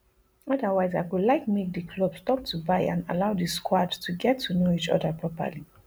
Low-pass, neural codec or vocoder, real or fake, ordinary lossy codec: none; none; real; none